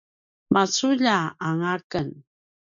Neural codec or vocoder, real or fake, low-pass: none; real; 7.2 kHz